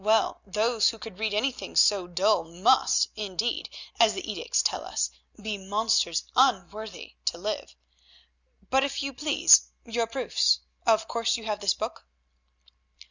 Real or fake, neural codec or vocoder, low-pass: real; none; 7.2 kHz